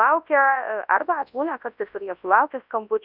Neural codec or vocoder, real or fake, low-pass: codec, 24 kHz, 0.9 kbps, WavTokenizer, large speech release; fake; 5.4 kHz